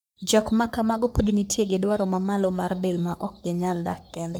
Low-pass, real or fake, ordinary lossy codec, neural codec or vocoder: none; fake; none; codec, 44.1 kHz, 3.4 kbps, Pupu-Codec